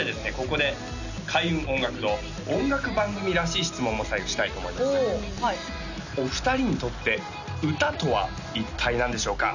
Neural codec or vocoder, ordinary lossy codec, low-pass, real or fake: none; none; 7.2 kHz; real